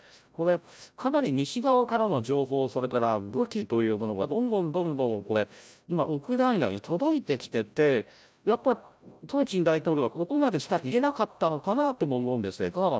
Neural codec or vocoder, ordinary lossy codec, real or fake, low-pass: codec, 16 kHz, 0.5 kbps, FreqCodec, larger model; none; fake; none